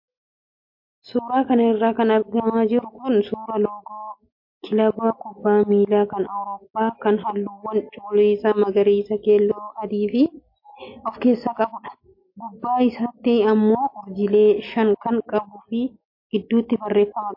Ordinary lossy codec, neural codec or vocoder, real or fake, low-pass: MP3, 32 kbps; none; real; 5.4 kHz